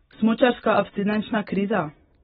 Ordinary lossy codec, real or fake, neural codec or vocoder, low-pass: AAC, 16 kbps; real; none; 7.2 kHz